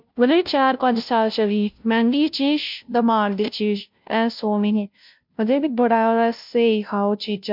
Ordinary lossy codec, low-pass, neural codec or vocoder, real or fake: MP3, 48 kbps; 5.4 kHz; codec, 16 kHz, 0.5 kbps, FunCodec, trained on Chinese and English, 25 frames a second; fake